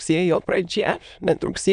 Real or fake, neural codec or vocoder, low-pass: fake; autoencoder, 22.05 kHz, a latent of 192 numbers a frame, VITS, trained on many speakers; 9.9 kHz